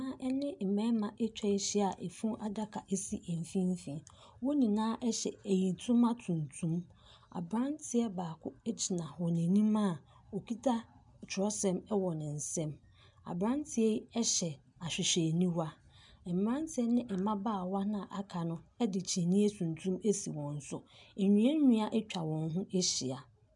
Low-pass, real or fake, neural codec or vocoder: 10.8 kHz; real; none